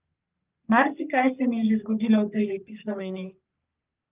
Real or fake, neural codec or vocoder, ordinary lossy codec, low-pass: fake; codec, 44.1 kHz, 3.4 kbps, Pupu-Codec; Opus, 24 kbps; 3.6 kHz